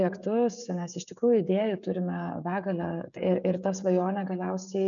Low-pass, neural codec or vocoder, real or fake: 7.2 kHz; codec, 16 kHz, 16 kbps, FreqCodec, smaller model; fake